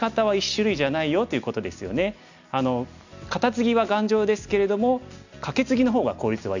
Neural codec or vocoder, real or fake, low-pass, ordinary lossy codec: none; real; 7.2 kHz; none